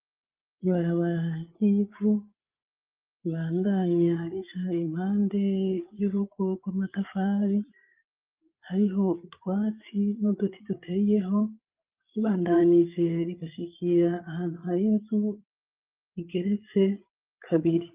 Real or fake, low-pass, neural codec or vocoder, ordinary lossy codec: fake; 3.6 kHz; codec, 16 kHz, 8 kbps, FreqCodec, larger model; Opus, 24 kbps